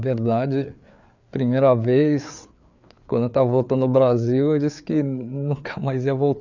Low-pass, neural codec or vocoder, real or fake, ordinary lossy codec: 7.2 kHz; codec, 16 kHz, 4 kbps, FreqCodec, larger model; fake; none